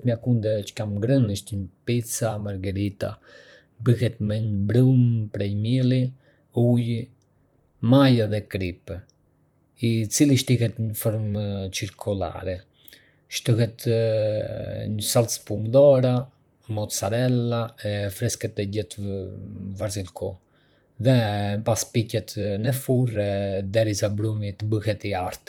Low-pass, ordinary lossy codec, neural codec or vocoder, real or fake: 19.8 kHz; none; vocoder, 44.1 kHz, 128 mel bands, Pupu-Vocoder; fake